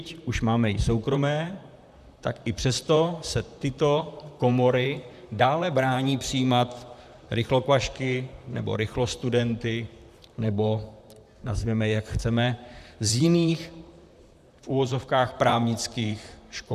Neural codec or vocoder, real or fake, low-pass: vocoder, 44.1 kHz, 128 mel bands, Pupu-Vocoder; fake; 14.4 kHz